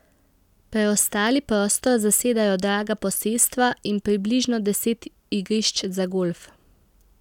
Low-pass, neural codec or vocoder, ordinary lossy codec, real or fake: 19.8 kHz; none; none; real